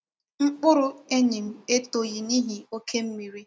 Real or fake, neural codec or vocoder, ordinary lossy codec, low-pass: real; none; none; none